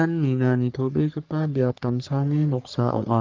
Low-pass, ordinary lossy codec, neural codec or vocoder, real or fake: 7.2 kHz; Opus, 24 kbps; codec, 44.1 kHz, 3.4 kbps, Pupu-Codec; fake